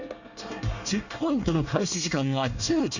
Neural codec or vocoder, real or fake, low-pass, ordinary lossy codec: codec, 24 kHz, 1 kbps, SNAC; fake; 7.2 kHz; none